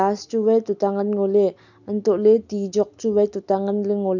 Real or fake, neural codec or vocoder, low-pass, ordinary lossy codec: real; none; 7.2 kHz; none